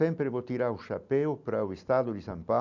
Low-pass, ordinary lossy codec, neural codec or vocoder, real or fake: 7.2 kHz; none; none; real